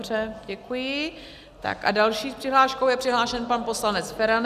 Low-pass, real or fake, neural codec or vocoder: 14.4 kHz; real; none